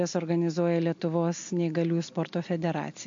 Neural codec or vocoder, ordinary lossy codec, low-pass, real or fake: none; MP3, 48 kbps; 7.2 kHz; real